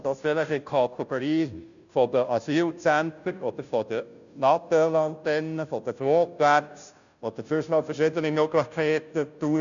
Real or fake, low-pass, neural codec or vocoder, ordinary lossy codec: fake; 7.2 kHz; codec, 16 kHz, 0.5 kbps, FunCodec, trained on Chinese and English, 25 frames a second; none